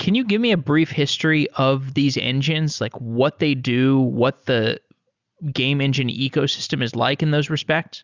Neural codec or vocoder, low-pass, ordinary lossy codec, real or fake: none; 7.2 kHz; Opus, 64 kbps; real